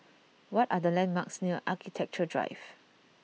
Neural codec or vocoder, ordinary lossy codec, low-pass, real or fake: none; none; none; real